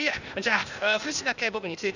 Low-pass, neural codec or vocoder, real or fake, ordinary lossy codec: 7.2 kHz; codec, 16 kHz, 0.8 kbps, ZipCodec; fake; none